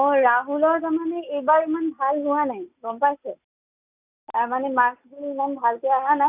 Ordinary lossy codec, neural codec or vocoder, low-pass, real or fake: none; none; 3.6 kHz; real